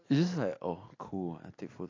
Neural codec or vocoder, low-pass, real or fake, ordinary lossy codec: none; 7.2 kHz; real; AAC, 32 kbps